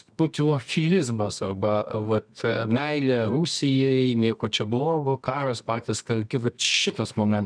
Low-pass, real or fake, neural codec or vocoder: 9.9 kHz; fake; codec, 24 kHz, 0.9 kbps, WavTokenizer, medium music audio release